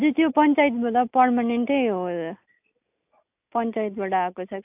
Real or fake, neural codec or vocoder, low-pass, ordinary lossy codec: real; none; 3.6 kHz; AAC, 32 kbps